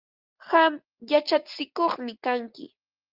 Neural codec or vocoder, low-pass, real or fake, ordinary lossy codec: none; 5.4 kHz; real; Opus, 32 kbps